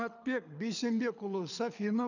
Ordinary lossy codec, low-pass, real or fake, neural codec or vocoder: none; 7.2 kHz; fake; codec, 24 kHz, 6 kbps, HILCodec